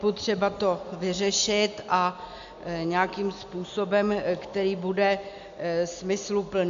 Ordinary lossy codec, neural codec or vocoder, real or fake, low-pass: MP3, 64 kbps; none; real; 7.2 kHz